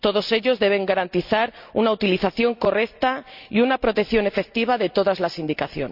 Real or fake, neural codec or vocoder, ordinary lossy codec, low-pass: real; none; none; 5.4 kHz